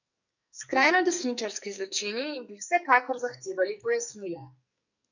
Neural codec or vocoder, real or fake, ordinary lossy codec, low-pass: codec, 44.1 kHz, 2.6 kbps, SNAC; fake; none; 7.2 kHz